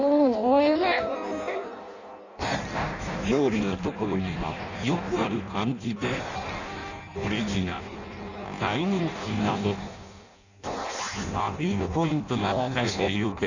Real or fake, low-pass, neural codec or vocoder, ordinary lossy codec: fake; 7.2 kHz; codec, 16 kHz in and 24 kHz out, 0.6 kbps, FireRedTTS-2 codec; none